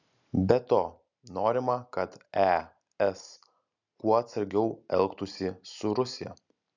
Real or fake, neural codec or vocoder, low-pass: real; none; 7.2 kHz